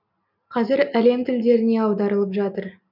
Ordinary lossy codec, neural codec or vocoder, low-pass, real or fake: none; none; 5.4 kHz; real